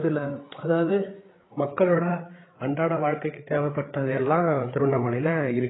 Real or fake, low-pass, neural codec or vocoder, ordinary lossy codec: fake; 7.2 kHz; codec, 16 kHz, 8 kbps, FreqCodec, larger model; AAC, 16 kbps